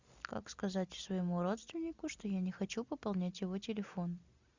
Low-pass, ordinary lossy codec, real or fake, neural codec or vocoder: 7.2 kHz; Opus, 64 kbps; real; none